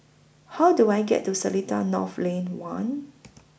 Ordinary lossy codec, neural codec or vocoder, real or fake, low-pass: none; none; real; none